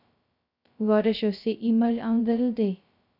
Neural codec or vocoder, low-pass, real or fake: codec, 16 kHz, 0.2 kbps, FocalCodec; 5.4 kHz; fake